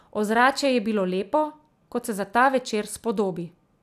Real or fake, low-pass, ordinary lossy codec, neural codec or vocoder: real; 14.4 kHz; none; none